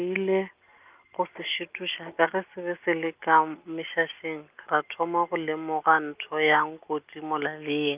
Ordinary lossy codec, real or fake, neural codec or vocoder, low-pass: Opus, 24 kbps; real; none; 3.6 kHz